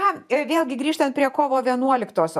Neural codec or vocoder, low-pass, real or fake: vocoder, 48 kHz, 128 mel bands, Vocos; 14.4 kHz; fake